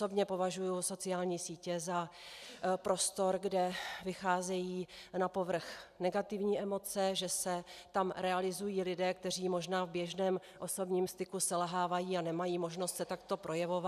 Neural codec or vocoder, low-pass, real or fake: none; 14.4 kHz; real